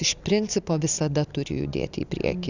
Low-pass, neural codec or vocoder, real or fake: 7.2 kHz; none; real